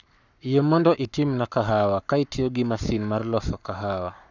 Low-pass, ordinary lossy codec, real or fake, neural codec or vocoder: 7.2 kHz; none; fake; codec, 44.1 kHz, 7.8 kbps, Pupu-Codec